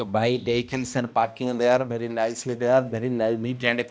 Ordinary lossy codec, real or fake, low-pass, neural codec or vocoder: none; fake; none; codec, 16 kHz, 1 kbps, X-Codec, HuBERT features, trained on balanced general audio